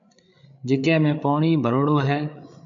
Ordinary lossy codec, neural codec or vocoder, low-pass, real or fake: MP3, 64 kbps; codec, 16 kHz, 8 kbps, FreqCodec, larger model; 7.2 kHz; fake